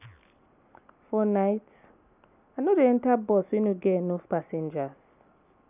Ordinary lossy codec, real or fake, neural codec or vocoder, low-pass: none; real; none; 3.6 kHz